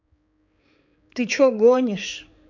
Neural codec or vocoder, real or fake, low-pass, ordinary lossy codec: codec, 16 kHz, 4 kbps, X-Codec, HuBERT features, trained on balanced general audio; fake; 7.2 kHz; none